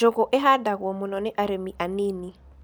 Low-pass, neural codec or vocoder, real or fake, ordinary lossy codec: none; none; real; none